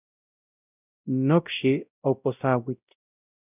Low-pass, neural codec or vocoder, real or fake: 3.6 kHz; codec, 16 kHz, 0.5 kbps, X-Codec, WavLM features, trained on Multilingual LibriSpeech; fake